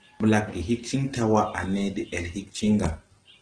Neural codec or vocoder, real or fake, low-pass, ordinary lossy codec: none; real; 9.9 kHz; Opus, 16 kbps